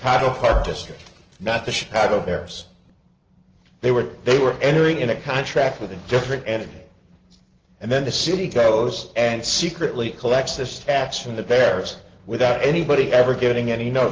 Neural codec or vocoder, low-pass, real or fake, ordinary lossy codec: none; 7.2 kHz; real; Opus, 16 kbps